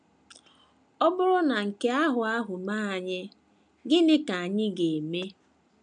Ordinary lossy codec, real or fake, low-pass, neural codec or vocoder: none; real; 9.9 kHz; none